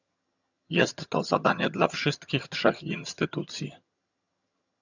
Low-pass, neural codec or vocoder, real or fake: 7.2 kHz; vocoder, 22.05 kHz, 80 mel bands, HiFi-GAN; fake